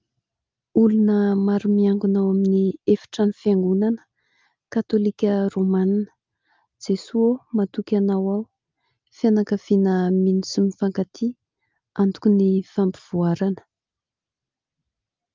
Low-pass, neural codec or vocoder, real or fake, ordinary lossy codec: 7.2 kHz; none; real; Opus, 24 kbps